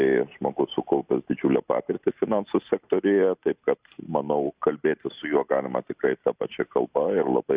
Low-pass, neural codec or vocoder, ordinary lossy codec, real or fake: 3.6 kHz; none; Opus, 64 kbps; real